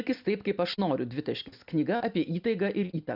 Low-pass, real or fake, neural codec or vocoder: 5.4 kHz; real; none